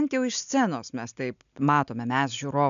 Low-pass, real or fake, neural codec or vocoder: 7.2 kHz; real; none